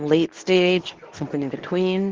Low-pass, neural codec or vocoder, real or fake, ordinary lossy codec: 7.2 kHz; codec, 24 kHz, 0.9 kbps, WavTokenizer, medium speech release version 2; fake; Opus, 16 kbps